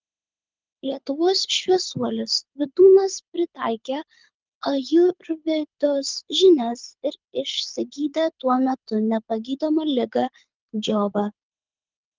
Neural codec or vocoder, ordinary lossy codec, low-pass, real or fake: codec, 24 kHz, 6 kbps, HILCodec; Opus, 24 kbps; 7.2 kHz; fake